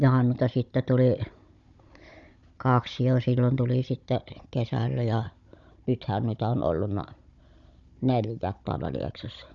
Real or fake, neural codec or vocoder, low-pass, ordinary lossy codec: fake; codec, 16 kHz, 16 kbps, FunCodec, trained on Chinese and English, 50 frames a second; 7.2 kHz; Opus, 64 kbps